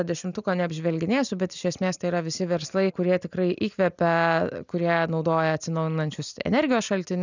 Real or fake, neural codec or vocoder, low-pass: real; none; 7.2 kHz